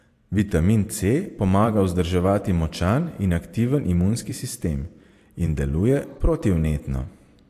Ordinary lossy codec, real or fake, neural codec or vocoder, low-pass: AAC, 64 kbps; fake; vocoder, 44.1 kHz, 128 mel bands every 512 samples, BigVGAN v2; 14.4 kHz